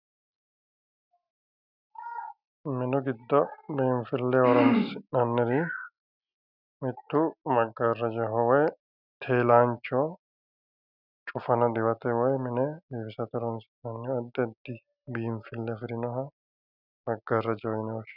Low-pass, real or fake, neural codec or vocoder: 5.4 kHz; real; none